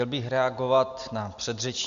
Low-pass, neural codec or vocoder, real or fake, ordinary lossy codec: 7.2 kHz; none; real; Opus, 64 kbps